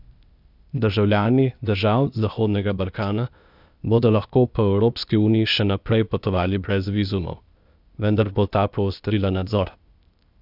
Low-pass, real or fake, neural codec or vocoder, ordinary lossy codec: 5.4 kHz; fake; codec, 16 kHz, 0.8 kbps, ZipCodec; none